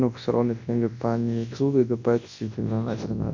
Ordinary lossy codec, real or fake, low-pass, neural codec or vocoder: MP3, 48 kbps; fake; 7.2 kHz; codec, 24 kHz, 0.9 kbps, WavTokenizer, large speech release